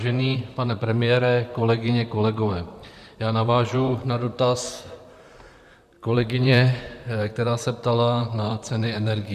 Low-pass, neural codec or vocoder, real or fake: 14.4 kHz; vocoder, 44.1 kHz, 128 mel bands, Pupu-Vocoder; fake